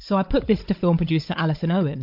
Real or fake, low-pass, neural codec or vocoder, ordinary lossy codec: fake; 5.4 kHz; codec, 16 kHz, 16 kbps, FunCodec, trained on Chinese and English, 50 frames a second; MP3, 48 kbps